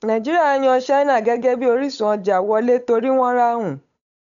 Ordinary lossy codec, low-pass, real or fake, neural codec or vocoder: none; 7.2 kHz; fake; codec, 16 kHz, 8 kbps, FunCodec, trained on Chinese and English, 25 frames a second